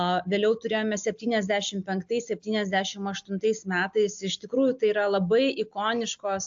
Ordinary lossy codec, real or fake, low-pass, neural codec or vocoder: AAC, 64 kbps; real; 7.2 kHz; none